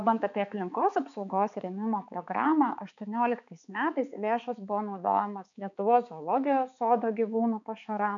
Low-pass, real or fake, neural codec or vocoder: 7.2 kHz; fake; codec, 16 kHz, 4 kbps, X-Codec, HuBERT features, trained on balanced general audio